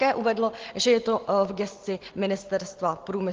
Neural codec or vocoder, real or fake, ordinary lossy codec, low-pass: none; real; Opus, 16 kbps; 7.2 kHz